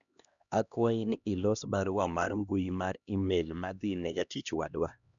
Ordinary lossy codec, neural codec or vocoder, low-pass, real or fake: none; codec, 16 kHz, 1 kbps, X-Codec, HuBERT features, trained on LibriSpeech; 7.2 kHz; fake